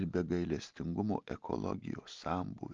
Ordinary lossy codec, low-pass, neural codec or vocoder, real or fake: Opus, 24 kbps; 7.2 kHz; none; real